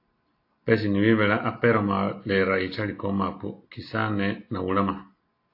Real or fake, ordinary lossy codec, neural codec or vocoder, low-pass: real; AAC, 32 kbps; none; 5.4 kHz